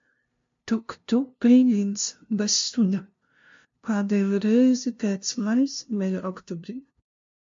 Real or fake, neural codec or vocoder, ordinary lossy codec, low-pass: fake; codec, 16 kHz, 0.5 kbps, FunCodec, trained on LibriTTS, 25 frames a second; MP3, 48 kbps; 7.2 kHz